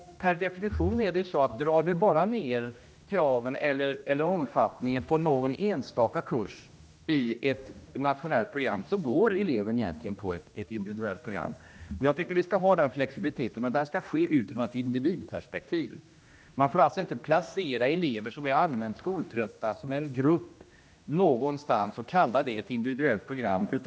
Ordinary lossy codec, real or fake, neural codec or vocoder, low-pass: none; fake; codec, 16 kHz, 1 kbps, X-Codec, HuBERT features, trained on general audio; none